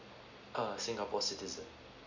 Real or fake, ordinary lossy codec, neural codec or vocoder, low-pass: real; none; none; 7.2 kHz